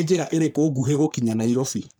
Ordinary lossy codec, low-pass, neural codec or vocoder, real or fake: none; none; codec, 44.1 kHz, 3.4 kbps, Pupu-Codec; fake